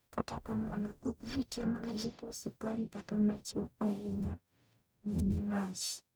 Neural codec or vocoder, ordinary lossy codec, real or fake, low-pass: codec, 44.1 kHz, 0.9 kbps, DAC; none; fake; none